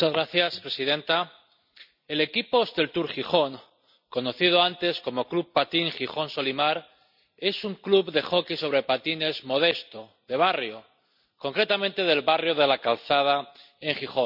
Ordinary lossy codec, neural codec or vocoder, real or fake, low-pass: none; none; real; 5.4 kHz